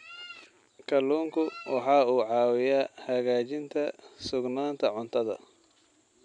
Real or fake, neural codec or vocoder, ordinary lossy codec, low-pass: real; none; none; 9.9 kHz